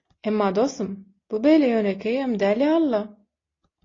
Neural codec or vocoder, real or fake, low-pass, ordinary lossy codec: none; real; 7.2 kHz; AAC, 32 kbps